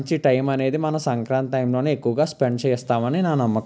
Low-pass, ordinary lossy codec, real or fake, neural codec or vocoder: none; none; real; none